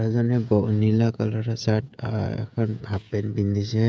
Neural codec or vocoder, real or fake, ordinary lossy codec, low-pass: codec, 16 kHz, 16 kbps, FreqCodec, smaller model; fake; none; none